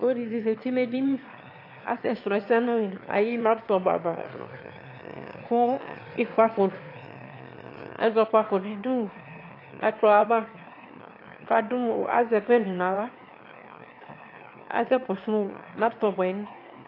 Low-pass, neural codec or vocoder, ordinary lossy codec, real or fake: 5.4 kHz; autoencoder, 22.05 kHz, a latent of 192 numbers a frame, VITS, trained on one speaker; AAC, 32 kbps; fake